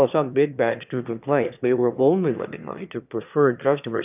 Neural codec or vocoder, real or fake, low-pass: autoencoder, 22.05 kHz, a latent of 192 numbers a frame, VITS, trained on one speaker; fake; 3.6 kHz